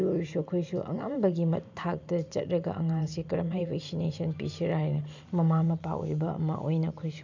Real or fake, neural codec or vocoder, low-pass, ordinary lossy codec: fake; vocoder, 44.1 kHz, 128 mel bands every 512 samples, BigVGAN v2; 7.2 kHz; none